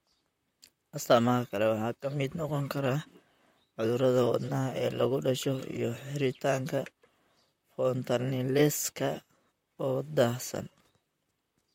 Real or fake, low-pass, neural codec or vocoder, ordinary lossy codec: fake; 19.8 kHz; vocoder, 44.1 kHz, 128 mel bands, Pupu-Vocoder; MP3, 64 kbps